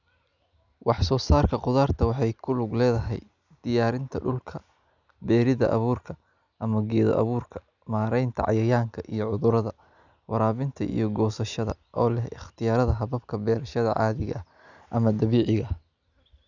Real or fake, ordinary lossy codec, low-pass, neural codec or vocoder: real; none; 7.2 kHz; none